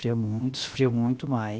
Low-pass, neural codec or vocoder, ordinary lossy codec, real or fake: none; codec, 16 kHz, about 1 kbps, DyCAST, with the encoder's durations; none; fake